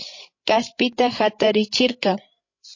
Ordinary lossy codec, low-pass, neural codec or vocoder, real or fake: MP3, 32 kbps; 7.2 kHz; codec, 16 kHz, 16 kbps, FreqCodec, larger model; fake